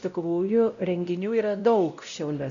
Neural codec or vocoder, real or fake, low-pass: codec, 16 kHz, 0.5 kbps, X-Codec, WavLM features, trained on Multilingual LibriSpeech; fake; 7.2 kHz